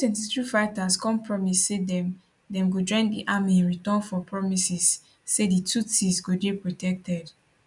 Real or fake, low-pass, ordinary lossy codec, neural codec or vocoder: real; 10.8 kHz; none; none